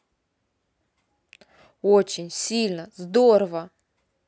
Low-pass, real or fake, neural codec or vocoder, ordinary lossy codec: none; real; none; none